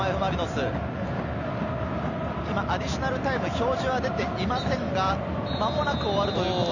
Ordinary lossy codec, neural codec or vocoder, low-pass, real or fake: none; none; 7.2 kHz; real